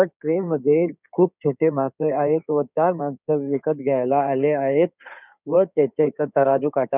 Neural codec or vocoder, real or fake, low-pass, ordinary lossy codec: codec, 16 kHz in and 24 kHz out, 2.2 kbps, FireRedTTS-2 codec; fake; 3.6 kHz; none